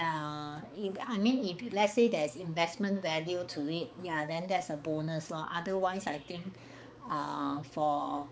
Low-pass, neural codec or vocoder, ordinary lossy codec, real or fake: none; codec, 16 kHz, 4 kbps, X-Codec, HuBERT features, trained on balanced general audio; none; fake